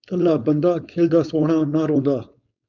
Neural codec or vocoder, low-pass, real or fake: codec, 16 kHz, 4.8 kbps, FACodec; 7.2 kHz; fake